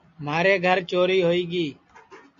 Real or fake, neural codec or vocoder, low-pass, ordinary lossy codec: real; none; 7.2 kHz; MP3, 48 kbps